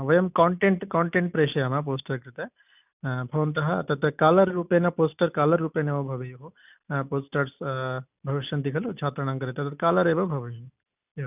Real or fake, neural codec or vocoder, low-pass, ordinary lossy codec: real; none; 3.6 kHz; none